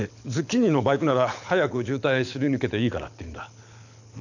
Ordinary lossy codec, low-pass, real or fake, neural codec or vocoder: none; 7.2 kHz; fake; codec, 24 kHz, 6 kbps, HILCodec